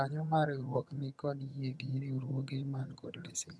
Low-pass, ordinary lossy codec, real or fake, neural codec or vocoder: none; none; fake; vocoder, 22.05 kHz, 80 mel bands, HiFi-GAN